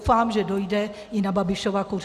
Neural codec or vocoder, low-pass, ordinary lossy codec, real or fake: none; 14.4 kHz; Opus, 64 kbps; real